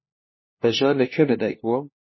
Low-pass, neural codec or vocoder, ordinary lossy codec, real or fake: 7.2 kHz; codec, 16 kHz, 1 kbps, FunCodec, trained on LibriTTS, 50 frames a second; MP3, 24 kbps; fake